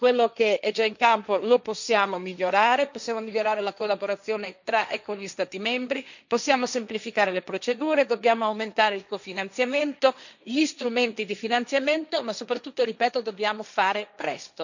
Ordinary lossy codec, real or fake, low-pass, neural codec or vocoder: none; fake; 7.2 kHz; codec, 16 kHz, 1.1 kbps, Voila-Tokenizer